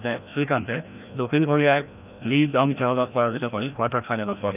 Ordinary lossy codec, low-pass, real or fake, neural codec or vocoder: none; 3.6 kHz; fake; codec, 16 kHz, 1 kbps, FreqCodec, larger model